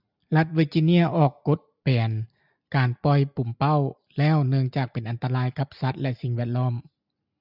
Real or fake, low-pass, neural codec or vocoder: real; 5.4 kHz; none